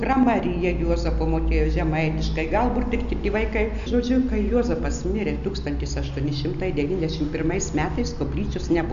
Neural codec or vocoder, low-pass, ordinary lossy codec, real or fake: none; 7.2 kHz; MP3, 96 kbps; real